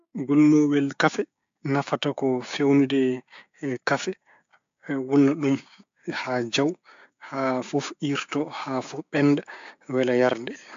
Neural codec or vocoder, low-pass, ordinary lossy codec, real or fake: codec, 16 kHz, 6 kbps, DAC; 7.2 kHz; AAC, 64 kbps; fake